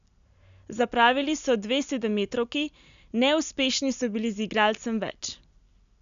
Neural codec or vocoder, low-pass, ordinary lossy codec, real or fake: none; 7.2 kHz; none; real